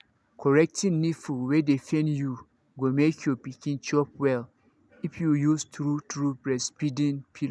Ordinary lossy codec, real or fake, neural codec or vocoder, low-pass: none; real; none; none